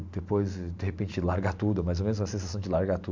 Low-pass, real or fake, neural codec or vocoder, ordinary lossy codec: 7.2 kHz; fake; vocoder, 44.1 kHz, 128 mel bands every 512 samples, BigVGAN v2; MP3, 64 kbps